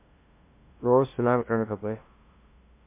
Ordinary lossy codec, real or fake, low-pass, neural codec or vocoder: MP3, 24 kbps; fake; 3.6 kHz; codec, 16 kHz, 0.5 kbps, FunCodec, trained on LibriTTS, 25 frames a second